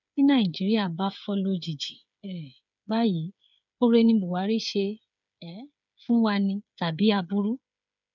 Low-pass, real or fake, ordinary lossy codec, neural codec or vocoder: 7.2 kHz; fake; none; codec, 16 kHz, 8 kbps, FreqCodec, smaller model